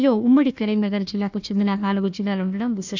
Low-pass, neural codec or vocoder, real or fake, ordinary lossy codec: 7.2 kHz; codec, 16 kHz, 1 kbps, FunCodec, trained on Chinese and English, 50 frames a second; fake; none